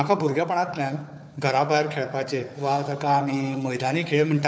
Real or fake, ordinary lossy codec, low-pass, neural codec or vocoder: fake; none; none; codec, 16 kHz, 4 kbps, FunCodec, trained on Chinese and English, 50 frames a second